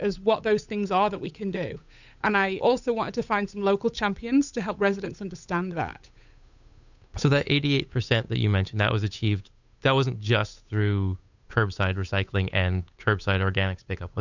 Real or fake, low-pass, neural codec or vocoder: fake; 7.2 kHz; codec, 16 kHz, 8 kbps, FunCodec, trained on Chinese and English, 25 frames a second